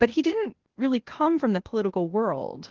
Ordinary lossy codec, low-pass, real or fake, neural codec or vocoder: Opus, 16 kbps; 7.2 kHz; fake; codec, 16 kHz, 0.8 kbps, ZipCodec